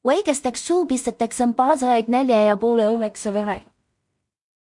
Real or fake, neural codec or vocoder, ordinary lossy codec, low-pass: fake; codec, 16 kHz in and 24 kHz out, 0.4 kbps, LongCat-Audio-Codec, two codebook decoder; MP3, 64 kbps; 10.8 kHz